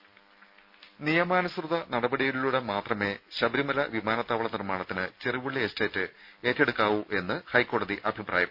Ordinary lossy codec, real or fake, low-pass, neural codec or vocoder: none; real; 5.4 kHz; none